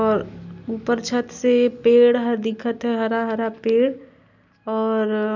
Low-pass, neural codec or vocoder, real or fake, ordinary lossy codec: 7.2 kHz; none; real; none